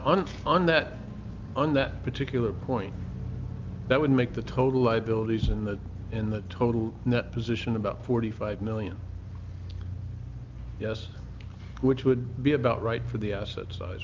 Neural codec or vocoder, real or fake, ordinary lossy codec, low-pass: none; real; Opus, 24 kbps; 7.2 kHz